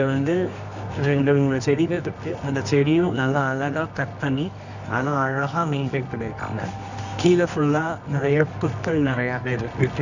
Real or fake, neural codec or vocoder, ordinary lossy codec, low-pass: fake; codec, 24 kHz, 0.9 kbps, WavTokenizer, medium music audio release; none; 7.2 kHz